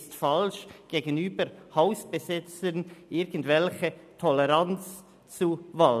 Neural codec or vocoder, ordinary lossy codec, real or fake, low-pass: none; none; real; 14.4 kHz